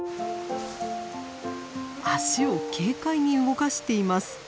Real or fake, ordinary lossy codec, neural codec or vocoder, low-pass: real; none; none; none